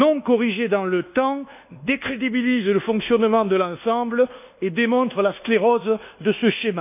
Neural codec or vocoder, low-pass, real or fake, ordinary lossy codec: codec, 24 kHz, 1.2 kbps, DualCodec; 3.6 kHz; fake; none